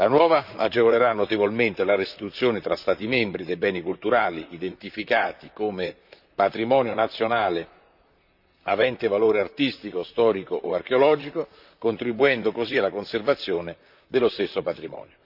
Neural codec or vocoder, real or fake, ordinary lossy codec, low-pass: vocoder, 44.1 kHz, 128 mel bands, Pupu-Vocoder; fake; none; 5.4 kHz